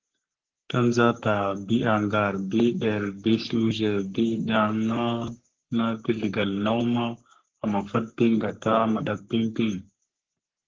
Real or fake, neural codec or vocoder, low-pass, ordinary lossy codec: fake; codec, 44.1 kHz, 3.4 kbps, Pupu-Codec; 7.2 kHz; Opus, 16 kbps